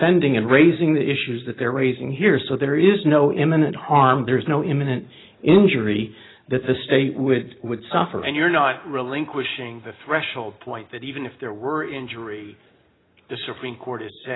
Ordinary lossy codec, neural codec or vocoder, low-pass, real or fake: AAC, 16 kbps; none; 7.2 kHz; real